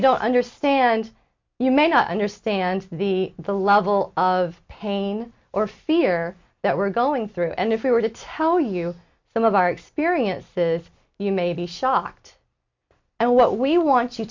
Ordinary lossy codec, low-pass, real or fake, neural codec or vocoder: MP3, 64 kbps; 7.2 kHz; real; none